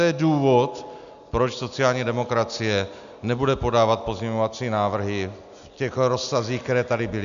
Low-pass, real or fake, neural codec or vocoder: 7.2 kHz; real; none